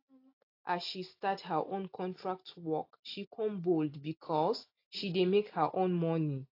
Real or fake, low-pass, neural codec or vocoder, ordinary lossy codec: real; 5.4 kHz; none; AAC, 32 kbps